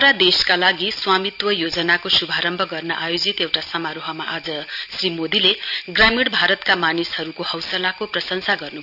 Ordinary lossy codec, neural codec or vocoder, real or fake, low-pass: none; none; real; 5.4 kHz